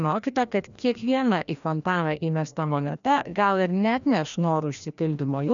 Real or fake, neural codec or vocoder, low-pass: fake; codec, 16 kHz, 1 kbps, FreqCodec, larger model; 7.2 kHz